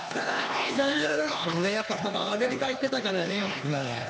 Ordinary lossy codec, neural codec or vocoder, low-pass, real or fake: none; codec, 16 kHz, 2 kbps, X-Codec, HuBERT features, trained on LibriSpeech; none; fake